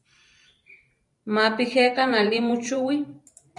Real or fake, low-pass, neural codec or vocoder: fake; 10.8 kHz; vocoder, 44.1 kHz, 128 mel bands every 512 samples, BigVGAN v2